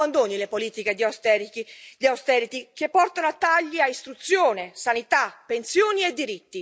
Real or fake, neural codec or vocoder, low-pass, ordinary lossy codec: real; none; none; none